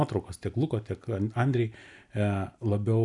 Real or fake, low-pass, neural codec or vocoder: real; 10.8 kHz; none